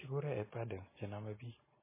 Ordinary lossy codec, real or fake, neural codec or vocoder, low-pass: MP3, 16 kbps; real; none; 3.6 kHz